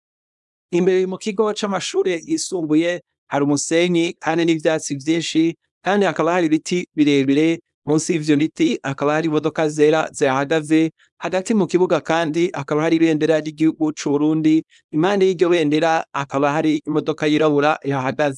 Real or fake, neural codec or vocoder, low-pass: fake; codec, 24 kHz, 0.9 kbps, WavTokenizer, small release; 10.8 kHz